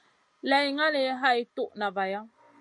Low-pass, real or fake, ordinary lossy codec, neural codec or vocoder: 10.8 kHz; real; MP3, 48 kbps; none